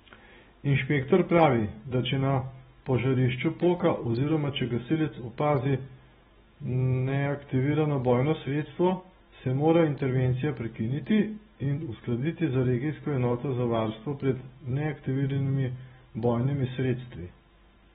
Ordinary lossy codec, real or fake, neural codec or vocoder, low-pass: AAC, 16 kbps; real; none; 19.8 kHz